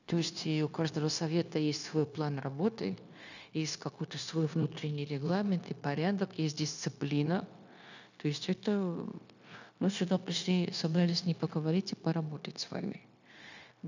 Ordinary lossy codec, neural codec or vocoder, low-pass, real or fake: none; codec, 16 kHz, 0.9 kbps, LongCat-Audio-Codec; 7.2 kHz; fake